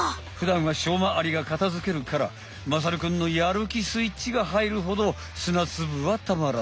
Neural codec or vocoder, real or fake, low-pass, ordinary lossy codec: none; real; none; none